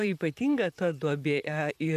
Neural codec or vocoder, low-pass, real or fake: vocoder, 44.1 kHz, 128 mel bands, Pupu-Vocoder; 14.4 kHz; fake